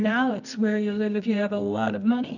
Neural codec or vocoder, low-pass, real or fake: codec, 24 kHz, 0.9 kbps, WavTokenizer, medium music audio release; 7.2 kHz; fake